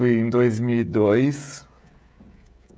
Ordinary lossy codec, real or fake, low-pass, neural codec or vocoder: none; fake; none; codec, 16 kHz, 16 kbps, FreqCodec, smaller model